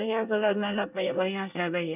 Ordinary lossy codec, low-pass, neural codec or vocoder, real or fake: none; 3.6 kHz; codec, 24 kHz, 1 kbps, SNAC; fake